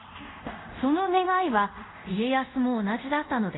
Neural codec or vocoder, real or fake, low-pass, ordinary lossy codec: codec, 24 kHz, 0.5 kbps, DualCodec; fake; 7.2 kHz; AAC, 16 kbps